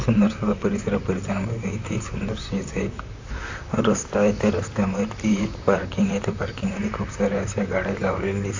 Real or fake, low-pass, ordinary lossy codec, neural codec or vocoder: fake; 7.2 kHz; AAC, 48 kbps; vocoder, 44.1 kHz, 128 mel bands, Pupu-Vocoder